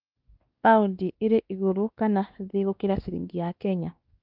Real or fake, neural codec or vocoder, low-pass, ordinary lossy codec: fake; codec, 16 kHz, 2 kbps, X-Codec, WavLM features, trained on Multilingual LibriSpeech; 5.4 kHz; Opus, 32 kbps